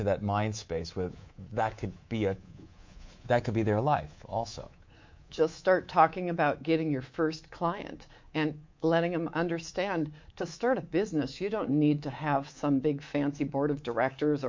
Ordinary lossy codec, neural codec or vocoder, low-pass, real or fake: MP3, 48 kbps; codec, 24 kHz, 3.1 kbps, DualCodec; 7.2 kHz; fake